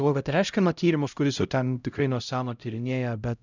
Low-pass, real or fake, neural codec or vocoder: 7.2 kHz; fake; codec, 16 kHz, 0.5 kbps, X-Codec, HuBERT features, trained on LibriSpeech